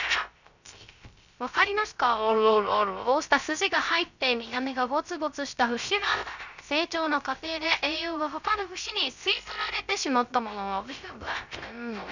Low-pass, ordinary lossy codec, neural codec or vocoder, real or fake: 7.2 kHz; none; codec, 16 kHz, 0.3 kbps, FocalCodec; fake